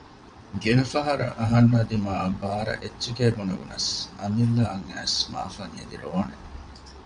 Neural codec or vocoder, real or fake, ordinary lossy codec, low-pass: vocoder, 22.05 kHz, 80 mel bands, WaveNeXt; fake; MP3, 64 kbps; 9.9 kHz